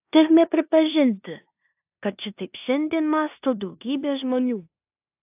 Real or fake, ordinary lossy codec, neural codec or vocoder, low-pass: fake; AAC, 32 kbps; codec, 16 kHz in and 24 kHz out, 0.9 kbps, LongCat-Audio-Codec, four codebook decoder; 3.6 kHz